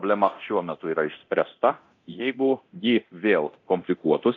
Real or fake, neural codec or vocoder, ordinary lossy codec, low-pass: fake; codec, 24 kHz, 0.9 kbps, DualCodec; AAC, 48 kbps; 7.2 kHz